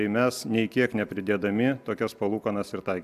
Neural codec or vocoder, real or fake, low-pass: none; real; 14.4 kHz